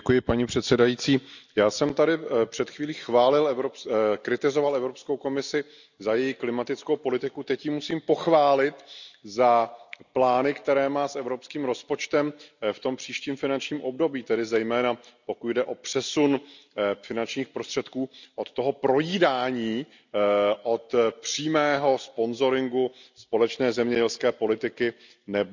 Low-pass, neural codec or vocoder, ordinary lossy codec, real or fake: 7.2 kHz; none; none; real